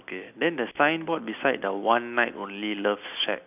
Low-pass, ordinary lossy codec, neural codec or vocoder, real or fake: 3.6 kHz; none; none; real